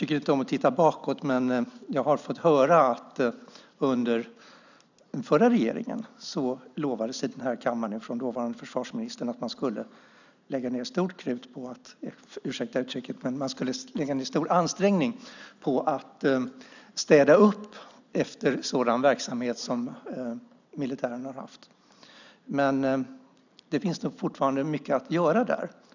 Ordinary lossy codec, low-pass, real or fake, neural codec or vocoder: none; 7.2 kHz; real; none